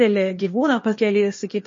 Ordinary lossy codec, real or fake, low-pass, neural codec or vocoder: MP3, 32 kbps; fake; 7.2 kHz; codec, 16 kHz, 0.8 kbps, ZipCodec